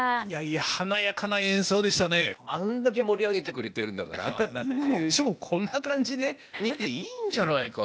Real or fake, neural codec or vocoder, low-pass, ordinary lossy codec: fake; codec, 16 kHz, 0.8 kbps, ZipCodec; none; none